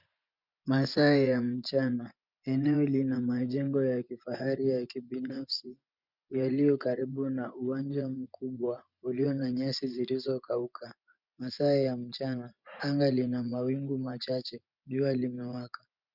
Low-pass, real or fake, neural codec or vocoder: 5.4 kHz; fake; vocoder, 24 kHz, 100 mel bands, Vocos